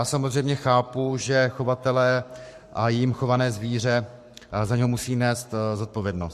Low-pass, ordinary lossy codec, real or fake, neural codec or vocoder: 14.4 kHz; MP3, 64 kbps; fake; codec, 44.1 kHz, 7.8 kbps, Pupu-Codec